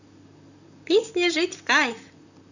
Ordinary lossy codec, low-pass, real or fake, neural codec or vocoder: none; 7.2 kHz; fake; vocoder, 44.1 kHz, 128 mel bands, Pupu-Vocoder